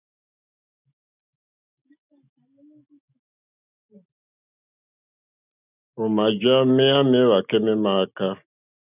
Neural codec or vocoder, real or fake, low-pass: none; real; 3.6 kHz